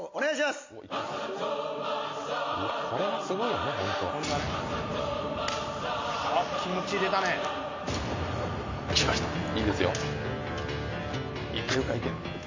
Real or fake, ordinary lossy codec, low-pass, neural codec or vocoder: real; MP3, 48 kbps; 7.2 kHz; none